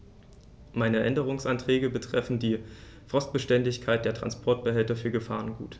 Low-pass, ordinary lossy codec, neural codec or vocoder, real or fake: none; none; none; real